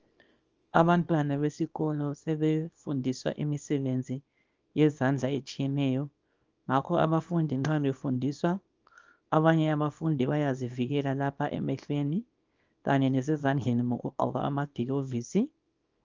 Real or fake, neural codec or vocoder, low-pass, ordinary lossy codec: fake; codec, 24 kHz, 0.9 kbps, WavTokenizer, small release; 7.2 kHz; Opus, 24 kbps